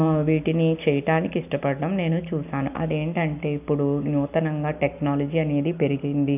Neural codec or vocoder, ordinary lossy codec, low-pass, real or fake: none; none; 3.6 kHz; real